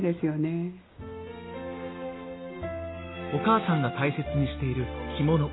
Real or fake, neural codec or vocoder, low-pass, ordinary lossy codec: real; none; 7.2 kHz; AAC, 16 kbps